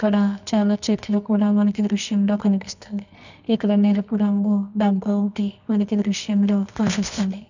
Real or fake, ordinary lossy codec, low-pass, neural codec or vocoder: fake; none; 7.2 kHz; codec, 24 kHz, 0.9 kbps, WavTokenizer, medium music audio release